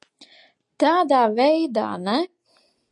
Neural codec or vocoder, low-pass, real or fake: none; 10.8 kHz; real